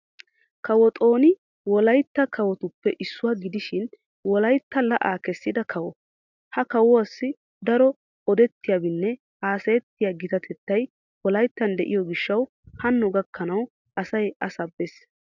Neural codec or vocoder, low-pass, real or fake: none; 7.2 kHz; real